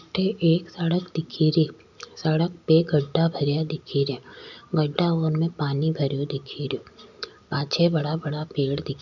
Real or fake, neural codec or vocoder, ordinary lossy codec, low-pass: real; none; none; 7.2 kHz